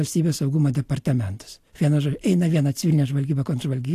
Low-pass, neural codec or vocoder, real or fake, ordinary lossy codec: 14.4 kHz; none; real; AAC, 64 kbps